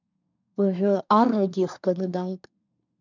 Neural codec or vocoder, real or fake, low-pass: codec, 24 kHz, 1 kbps, SNAC; fake; 7.2 kHz